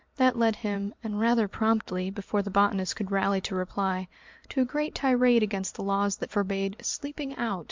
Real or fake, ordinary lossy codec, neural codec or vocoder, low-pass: fake; MP3, 64 kbps; vocoder, 44.1 kHz, 128 mel bands every 512 samples, BigVGAN v2; 7.2 kHz